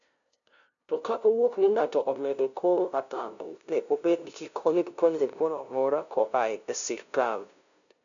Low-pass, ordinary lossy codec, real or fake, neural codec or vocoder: 7.2 kHz; none; fake; codec, 16 kHz, 0.5 kbps, FunCodec, trained on LibriTTS, 25 frames a second